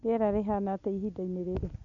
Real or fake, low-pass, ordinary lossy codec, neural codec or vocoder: real; 7.2 kHz; none; none